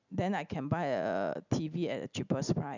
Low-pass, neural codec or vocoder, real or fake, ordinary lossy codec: 7.2 kHz; none; real; none